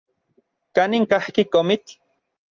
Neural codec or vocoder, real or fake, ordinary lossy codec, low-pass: none; real; Opus, 24 kbps; 7.2 kHz